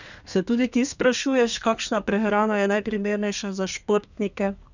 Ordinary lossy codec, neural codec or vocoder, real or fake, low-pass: none; codec, 32 kHz, 1.9 kbps, SNAC; fake; 7.2 kHz